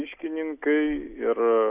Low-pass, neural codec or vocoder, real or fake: 3.6 kHz; none; real